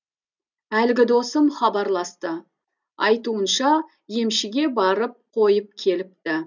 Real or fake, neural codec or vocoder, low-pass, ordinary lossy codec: real; none; 7.2 kHz; none